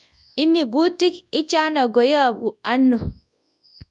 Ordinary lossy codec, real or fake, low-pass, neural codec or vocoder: none; fake; 10.8 kHz; codec, 24 kHz, 0.9 kbps, WavTokenizer, large speech release